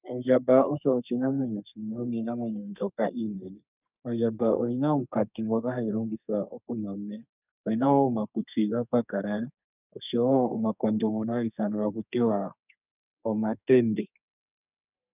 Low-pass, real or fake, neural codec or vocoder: 3.6 kHz; fake; codec, 32 kHz, 1.9 kbps, SNAC